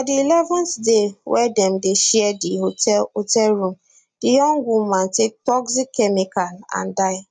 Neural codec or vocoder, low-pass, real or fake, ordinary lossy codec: none; 9.9 kHz; real; none